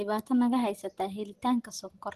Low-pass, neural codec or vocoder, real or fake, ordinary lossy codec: 14.4 kHz; none; real; Opus, 16 kbps